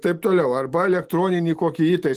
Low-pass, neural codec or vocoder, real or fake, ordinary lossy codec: 14.4 kHz; none; real; Opus, 24 kbps